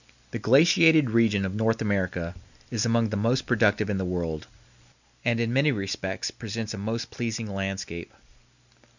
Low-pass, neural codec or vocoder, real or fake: 7.2 kHz; none; real